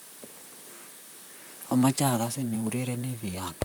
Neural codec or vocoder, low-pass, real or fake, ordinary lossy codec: vocoder, 44.1 kHz, 128 mel bands, Pupu-Vocoder; none; fake; none